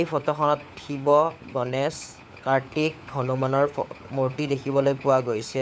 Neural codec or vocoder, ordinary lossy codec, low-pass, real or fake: codec, 16 kHz, 4 kbps, FunCodec, trained on LibriTTS, 50 frames a second; none; none; fake